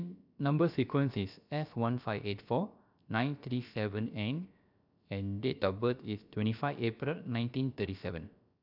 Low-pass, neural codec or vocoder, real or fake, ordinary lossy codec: 5.4 kHz; codec, 16 kHz, about 1 kbps, DyCAST, with the encoder's durations; fake; none